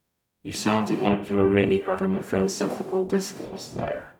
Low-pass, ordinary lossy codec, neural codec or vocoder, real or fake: 19.8 kHz; none; codec, 44.1 kHz, 0.9 kbps, DAC; fake